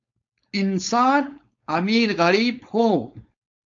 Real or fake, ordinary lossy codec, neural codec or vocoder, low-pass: fake; AAC, 64 kbps; codec, 16 kHz, 4.8 kbps, FACodec; 7.2 kHz